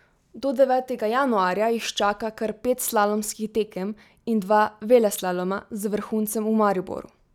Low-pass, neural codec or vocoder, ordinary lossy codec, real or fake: 19.8 kHz; none; none; real